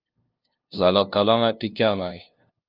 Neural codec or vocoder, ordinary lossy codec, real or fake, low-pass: codec, 16 kHz, 0.5 kbps, FunCodec, trained on LibriTTS, 25 frames a second; Opus, 24 kbps; fake; 5.4 kHz